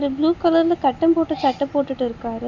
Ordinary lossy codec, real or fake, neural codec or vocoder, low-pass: none; real; none; 7.2 kHz